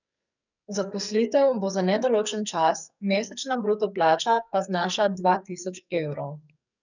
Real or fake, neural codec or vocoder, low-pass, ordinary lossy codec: fake; codec, 44.1 kHz, 2.6 kbps, SNAC; 7.2 kHz; none